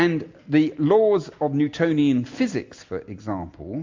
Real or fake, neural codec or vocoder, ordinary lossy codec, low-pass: real; none; MP3, 48 kbps; 7.2 kHz